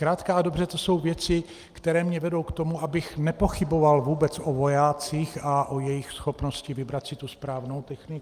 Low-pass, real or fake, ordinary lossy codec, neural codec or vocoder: 14.4 kHz; real; Opus, 32 kbps; none